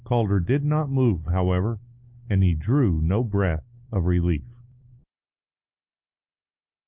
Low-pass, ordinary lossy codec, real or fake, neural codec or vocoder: 3.6 kHz; Opus, 24 kbps; real; none